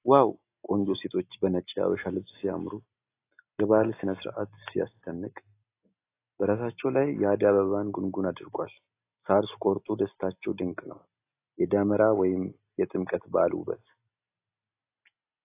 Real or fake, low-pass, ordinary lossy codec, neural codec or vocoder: real; 3.6 kHz; AAC, 24 kbps; none